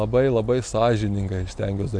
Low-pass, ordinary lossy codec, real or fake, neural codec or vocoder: 9.9 kHz; MP3, 64 kbps; real; none